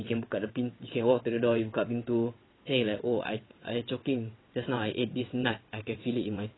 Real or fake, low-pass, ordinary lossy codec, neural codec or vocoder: fake; 7.2 kHz; AAC, 16 kbps; vocoder, 22.05 kHz, 80 mel bands, WaveNeXt